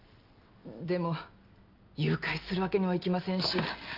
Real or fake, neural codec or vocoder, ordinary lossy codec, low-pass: real; none; Opus, 24 kbps; 5.4 kHz